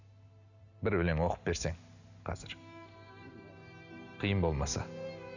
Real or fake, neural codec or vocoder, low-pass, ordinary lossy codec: real; none; 7.2 kHz; none